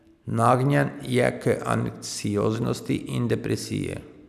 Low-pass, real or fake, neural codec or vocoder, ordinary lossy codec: 14.4 kHz; real; none; none